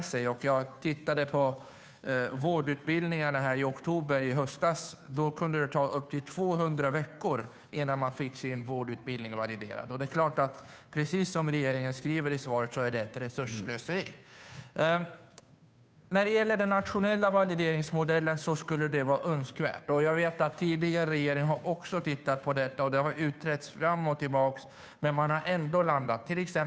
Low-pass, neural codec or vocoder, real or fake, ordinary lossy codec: none; codec, 16 kHz, 2 kbps, FunCodec, trained on Chinese and English, 25 frames a second; fake; none